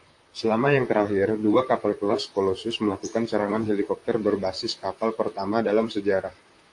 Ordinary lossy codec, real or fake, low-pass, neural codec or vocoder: AAC, 64 kbps; fake; 10.8 kHz; vocoder, 44.1 kHz, 128 mel bands, Pupu-Vocoder